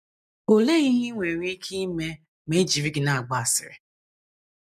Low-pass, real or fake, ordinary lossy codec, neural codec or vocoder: 14.4 kHz; real; none; none